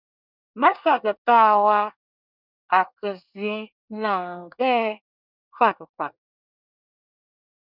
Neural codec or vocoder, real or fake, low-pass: codec, 24 kHz, 1 kbps, SNAC; fake; 5.4 kHz